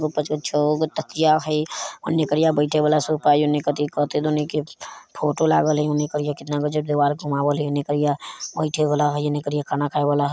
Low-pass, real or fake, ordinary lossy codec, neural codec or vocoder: none; real; none; none